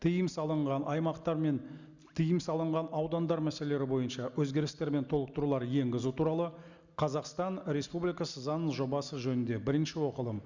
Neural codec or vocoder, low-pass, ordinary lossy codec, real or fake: none; 7.2 kHz; Opus, 64 kbps; real